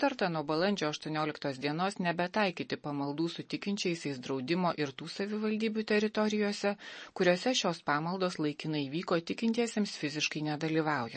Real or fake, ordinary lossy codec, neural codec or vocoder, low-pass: real; MP3, 32 kbps; none; 10.8 kHz